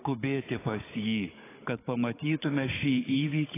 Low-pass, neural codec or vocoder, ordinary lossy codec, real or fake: 3.6 kHz; codec, 16 kHz, 16 kbps, FunCodec, trained on Chinese and English, 50 frames a second; AAC, 16 kbps; fake